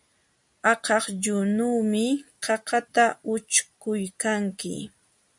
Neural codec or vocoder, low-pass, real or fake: none; 10.8 kHz; real